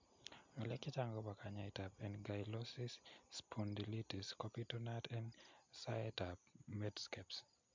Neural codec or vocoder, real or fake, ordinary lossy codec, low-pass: none; real; MP3, 64 kbps; 7.2 kHz